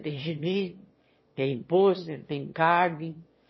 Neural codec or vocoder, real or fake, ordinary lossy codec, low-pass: autoencoder, 22.05 kHz, a latent of 192 numbers a frame, VITS, trained on one speaker; fake; MP3, 24 kbps; 7.2 kHz